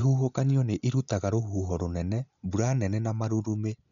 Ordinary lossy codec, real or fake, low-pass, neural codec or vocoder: MP3, 64 kbps; real; 7.2 kHz; none